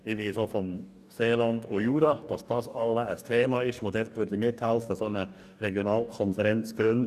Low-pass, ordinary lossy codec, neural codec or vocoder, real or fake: 14.4 kHz; none; codec, 44.1 kHz, 2.6 kbps, DAC; fake